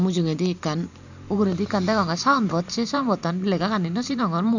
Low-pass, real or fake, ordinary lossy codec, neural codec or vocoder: 7.2 kHz; fake; none; vocoder, 44.1 kHz, 128 mel bands every 512 samples, BigVGAN v2